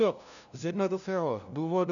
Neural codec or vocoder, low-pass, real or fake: codec, 16 kHz, 0.5 kbps, FunCodec, trained on LibriTTS, 25 frames a second; 7.2 kHz; fake